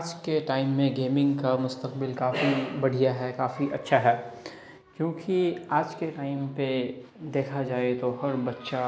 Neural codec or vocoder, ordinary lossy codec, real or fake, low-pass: none; none; real; none